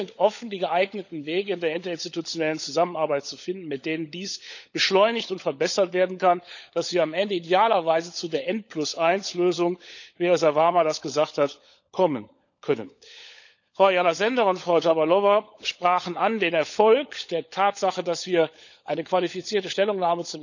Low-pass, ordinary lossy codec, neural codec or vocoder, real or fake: 7.2 kHz; none; codec, 16 kHz, 16 kbps, FunCodec, trained on LibriTTS, 50 frames a second; fake